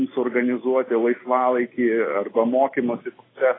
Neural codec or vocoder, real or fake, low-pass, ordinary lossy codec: none; real; 7.2 kHz; AAC, 16 kbps